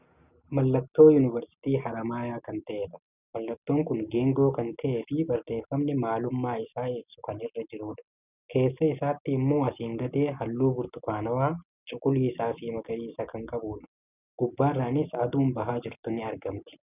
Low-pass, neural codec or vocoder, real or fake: 3.6 kHz; none; real